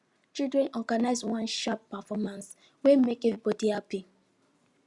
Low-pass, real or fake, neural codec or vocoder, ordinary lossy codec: 10.8 kHz; real; none; Opus, 64 kbps